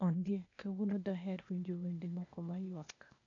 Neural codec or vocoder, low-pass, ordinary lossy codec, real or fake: codec, 16 kHz, 0.8 kbps, ZipCodec; 7.2 kHz; none; fake